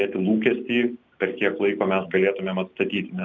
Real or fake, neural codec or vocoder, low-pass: real; none; 7.2 kHz